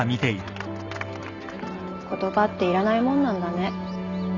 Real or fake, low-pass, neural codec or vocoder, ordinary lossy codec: real; 7.2 kHz; none; none